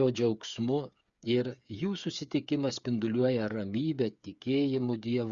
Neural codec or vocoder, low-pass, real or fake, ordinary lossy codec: codec, 16 kHz, 8 kbps, FreqCodec, smaller model; 7.2 kHz; fake; Opus, 64 kbps